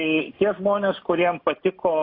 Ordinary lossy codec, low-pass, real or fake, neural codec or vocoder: AAC, 32 kbps; 5.4 kHz; real; none